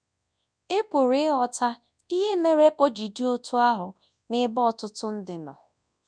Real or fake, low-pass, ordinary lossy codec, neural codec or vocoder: fake; 9.9 kHz; none; codec, 24 kHz, 0.9 kbps, WavTokenizer, large speech release